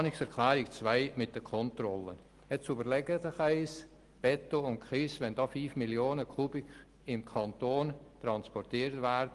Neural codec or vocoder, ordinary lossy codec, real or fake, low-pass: none; Opus, 24 kbps; real; 10.8 kHz